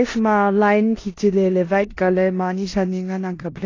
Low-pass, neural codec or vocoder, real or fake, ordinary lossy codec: 7.2 kHz; codec, 16 kHz in and 24 kHz out, 0.9 kbps, LongCat-Audio-Codec, four codebook decoder; fake; AAC, 32 kbps